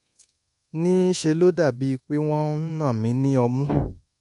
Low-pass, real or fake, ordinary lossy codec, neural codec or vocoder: 10.8 kHz; fake; MP3, 64 kbps; codec, 24 kHz, 1.2 kbps, DualCodec